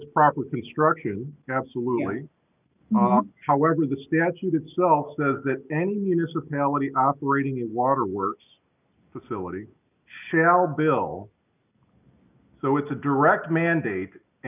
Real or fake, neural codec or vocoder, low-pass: real; none; 3.6 kHz